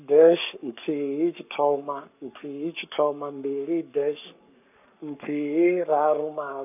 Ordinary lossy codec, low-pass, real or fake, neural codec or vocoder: none; 3.6 kHz; fake; codec, 44.1 kHz, 7.8 kbps, Pupu-Codec